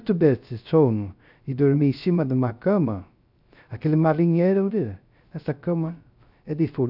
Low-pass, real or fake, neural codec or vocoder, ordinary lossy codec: 5.4 kHz; fake; codec, 16 kHz, 0.3 kbps, FocalCodec; none